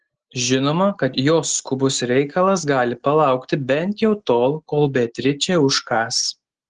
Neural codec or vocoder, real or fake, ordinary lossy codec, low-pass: none; real; Opus, 16 kbps; 10.8 kHz